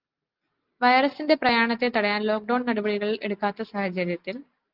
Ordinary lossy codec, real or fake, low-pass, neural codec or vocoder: Opus, 32 kbps; real; 5.4 kHz; none